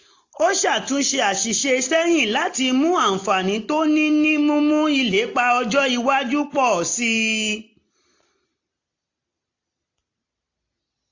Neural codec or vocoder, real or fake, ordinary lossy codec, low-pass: none; real; AAC, 48 kbps; 7.2 kHz